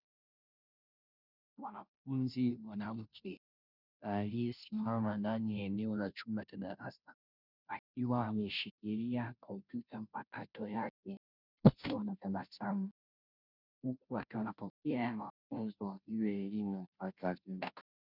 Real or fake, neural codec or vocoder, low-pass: fake; codec, 16 kHz, 0.5 kbps, FunCodec, trained on Chinese and English, 25 frames a second; 5.4 kHz